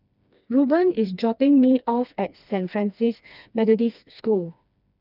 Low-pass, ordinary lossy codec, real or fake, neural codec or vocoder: 5.4 kHz; none; fake; codec, 16 kHz, 2 kbps, FreqCodec, smaller model